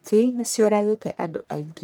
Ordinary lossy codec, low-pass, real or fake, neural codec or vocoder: none; none; fake; codec, 44.1 kHz, 1.7 kbps, Pupu-Codec